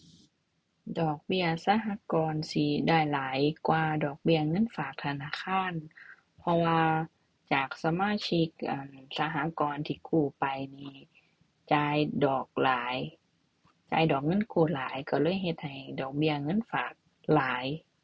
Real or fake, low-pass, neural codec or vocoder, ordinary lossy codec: real; none; none; none